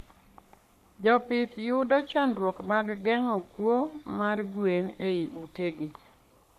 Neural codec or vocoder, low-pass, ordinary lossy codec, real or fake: codec, 44.1 kHz, 3.4 kbps, Pupu-Codec; 14.4 kHz; none; fake